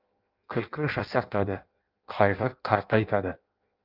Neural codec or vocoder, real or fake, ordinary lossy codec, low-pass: codec, 16 kHz in and 24 kHz out, 0.6 kbps, FireRedTTS-2 codec; fake; Opus, 24 kbps; 5.4 kHz